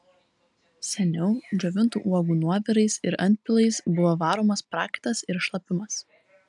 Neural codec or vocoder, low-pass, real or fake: none; 10.8 kHz; real